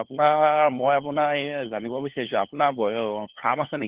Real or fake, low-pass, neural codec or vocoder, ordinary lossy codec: fake; 3.6 kHz; codec, 16 kHz, 4 kbps, FunCodec, trained on LibriTTS, 50 frames a second; Opus, 16 kbps